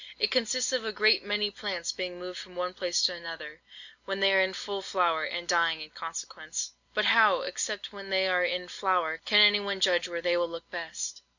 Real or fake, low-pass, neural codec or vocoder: real; 7.2 kHz; none